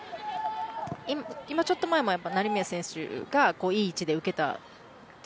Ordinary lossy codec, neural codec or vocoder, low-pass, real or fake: none; none; none; real